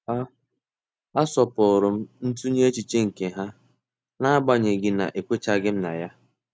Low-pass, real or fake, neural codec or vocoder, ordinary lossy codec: none; real; none; none